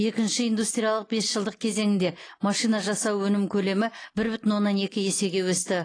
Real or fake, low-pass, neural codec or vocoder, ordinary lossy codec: real; 9.9 kHz; none; AAC, 32 kbps